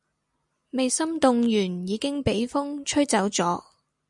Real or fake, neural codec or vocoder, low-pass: real; none; 10.8 kHz